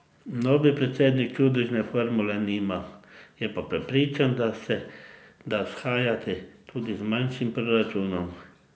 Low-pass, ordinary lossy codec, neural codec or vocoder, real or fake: none; none; none; real